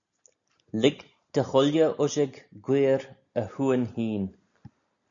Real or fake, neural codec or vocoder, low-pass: real; none; 7.2 kHz